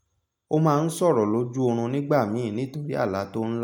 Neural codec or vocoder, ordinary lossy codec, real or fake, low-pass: none; MP3, 96 kbps; real; 19.8 kHz